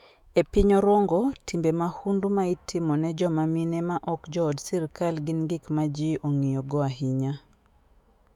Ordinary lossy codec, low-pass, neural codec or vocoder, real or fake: none; 19.8 kHz; autoencoder, 48 kHz, 128 numbers a frame, DAC-VAE, trained on Japanese speech; fake